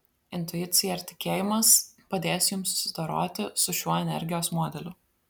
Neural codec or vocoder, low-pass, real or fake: none; 19.8 kHz; real